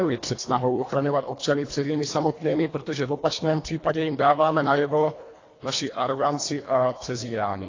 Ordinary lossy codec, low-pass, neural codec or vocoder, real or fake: AAC, 32 kbps; 7.2 kHz; codec, 24 kHz, 1.5 kbps, HILCodec; fake